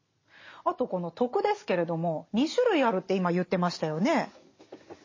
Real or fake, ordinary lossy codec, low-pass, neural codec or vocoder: real; MP3, 32 kbps; 7.2 kHz; none